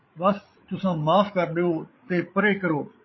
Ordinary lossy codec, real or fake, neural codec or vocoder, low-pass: MP3, 24 kbps; fake; codec, 16 kHz, 8 kbps, FreqCodec, larger model; 7.2 kHz